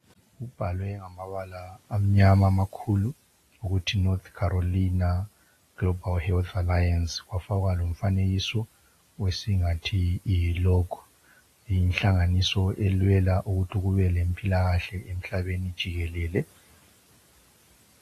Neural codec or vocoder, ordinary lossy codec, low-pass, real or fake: none; AAC, 48 kbps; 14.4 kHz; real